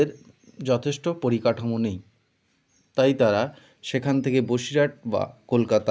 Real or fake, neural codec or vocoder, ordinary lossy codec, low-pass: real; none; none; none